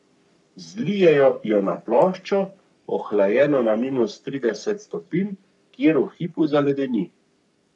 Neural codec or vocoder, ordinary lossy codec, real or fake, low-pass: codec, 44.1 kHz, 3.4 kbps, Pupu-Codec; none; fake; 10.8 kHz